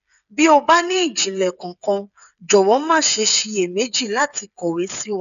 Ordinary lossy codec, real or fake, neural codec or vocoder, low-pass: none; fake; codec, 16 kHz, 8 kbps, FreqCodec, smaller model; 7.2 kHz